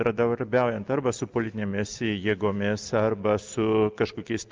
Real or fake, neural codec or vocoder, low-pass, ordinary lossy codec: real; none; 7.2 kHz; Opus, 24 kbps